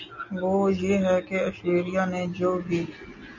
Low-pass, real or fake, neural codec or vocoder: 7.2 kHz; real; none